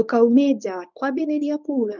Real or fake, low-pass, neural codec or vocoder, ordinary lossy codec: fake; 7.2 kHz; codec, 24 kHz, 0.9 kbps, WavTokenizer, medium speech release version 1; none